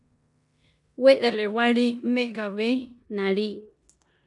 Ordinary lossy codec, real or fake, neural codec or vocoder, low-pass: AAC, 64 kbps; fake; codec, 16 kHz in and 24 kHz out, 0.9 kbps, LongCat-Audio-Codec, four codebook decoder; 10.8 kHz